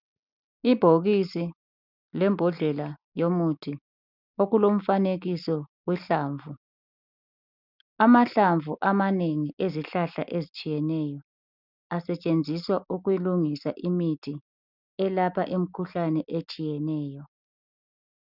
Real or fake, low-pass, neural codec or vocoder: real; 5.4 kHz; none